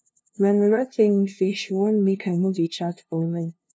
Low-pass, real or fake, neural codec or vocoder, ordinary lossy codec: none; fake; codec, 16 kHz, 0.5 kbps, FunCodec, trained on LibriTTS, 25 frames a second; none